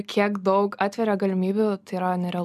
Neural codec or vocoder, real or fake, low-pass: none; real; 14.4 kHz